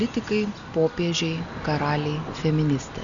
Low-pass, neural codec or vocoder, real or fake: 7.2 kHz; none; real